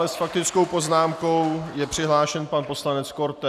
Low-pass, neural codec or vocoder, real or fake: 14.4 kHz; none; real